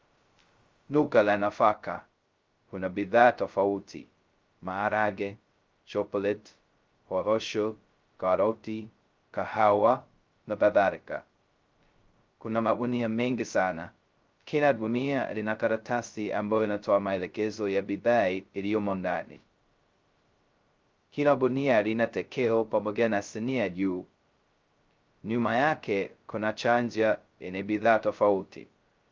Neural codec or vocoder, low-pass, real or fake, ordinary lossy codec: codec, 16 kHz, 0.2 kbps, FocalCodec; 7.2 kHz; fake; Opus, 32 kbps